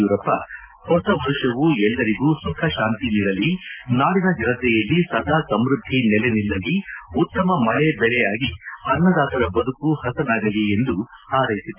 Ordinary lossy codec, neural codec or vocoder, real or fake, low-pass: Opus, 24 kbps; none; real; 3.6 kHz